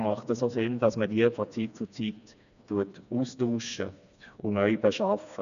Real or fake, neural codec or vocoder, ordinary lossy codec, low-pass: fake; codec, 16 kHz, 2 kbps, FreqCodec, smaller model; none; 7.2 kHz